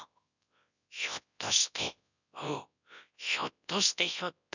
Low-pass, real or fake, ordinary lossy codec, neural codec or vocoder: 7.2 kHz; fake; none; codec, 24 kHz, 0.9 kbps, WavTokenizer, large speech release